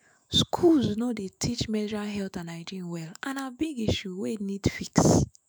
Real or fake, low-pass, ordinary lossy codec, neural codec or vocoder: fake; none; none; autoencoder, 48 kHz, 128 numbers a frame, DAC-VAE, trained on Japanese speech